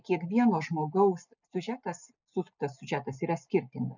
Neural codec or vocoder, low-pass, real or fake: none; 7.2 kHz; real